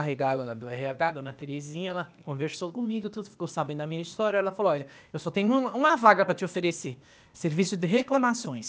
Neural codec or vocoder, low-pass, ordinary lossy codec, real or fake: codec, 16 kHz, 0.8 kbps, ZipCodec; none; none; fake